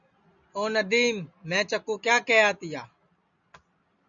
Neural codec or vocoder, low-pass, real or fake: none; 7.2 kHz; real